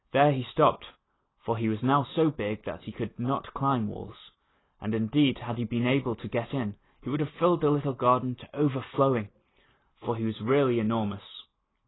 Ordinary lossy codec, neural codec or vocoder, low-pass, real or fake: AAC, 16 kbps; none; 7.2 kHz; real